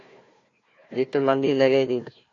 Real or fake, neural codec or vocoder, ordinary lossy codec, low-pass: fake; codec, 16 kHz, 1 kbps, FunCodec, trained on Chinese and English, 50 frames a second; AAC, 32 kbps; 7.2 kHz